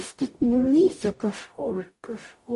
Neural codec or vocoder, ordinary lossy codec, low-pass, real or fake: codec, 44.1 kHz, 0.9 kbps, DAC; MP3, 48 kbps; 14.4 kHz; fake